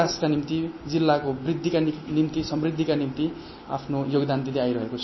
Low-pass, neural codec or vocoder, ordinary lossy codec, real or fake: 7.2 kHz; none; MP3, 24 kbps; real